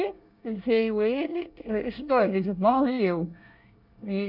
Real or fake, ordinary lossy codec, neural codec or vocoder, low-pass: fake; none; codec, 24 kHz, 1 kbps, SNAC; 5.4 kHz